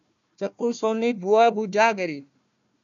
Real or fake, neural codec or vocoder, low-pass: fake; codec, 16 kHz, 1 kbps, FunCodec, trained on Chinese and English, 50 frames a second; 7.2 kHz